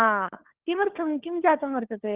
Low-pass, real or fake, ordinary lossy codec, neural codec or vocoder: 3.6 kHz; fake; Opus, 16 kbps; codec, 16 kHz, 4 kbps, FunCodec, trained on LibriTTS, 50 frames a second